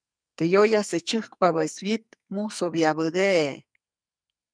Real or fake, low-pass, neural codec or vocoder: fake; 9.9 kHz; codec, 44.1 kHz, 2.6 kbps, SNAC